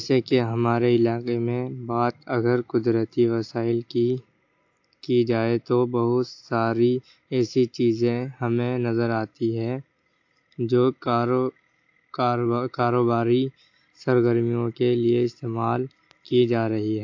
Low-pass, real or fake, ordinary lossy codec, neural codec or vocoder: 7.2 kHz; real; AAC, 48 kbps; none